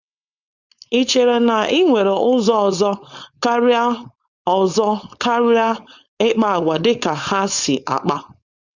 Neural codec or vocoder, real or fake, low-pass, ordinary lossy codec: codec, 16 kHz, 4.8 kbps, FACodec; fake; 7.2 kHz; Opus, 64 kbps